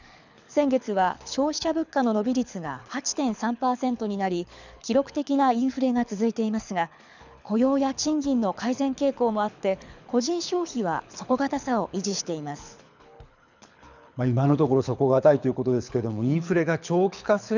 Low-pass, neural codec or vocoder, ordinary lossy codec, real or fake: 7.2 kHz; codec, 24 kHz, 6 kbps, HILCodec; none; fake